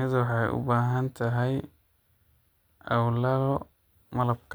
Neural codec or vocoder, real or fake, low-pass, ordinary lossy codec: none; real; none; none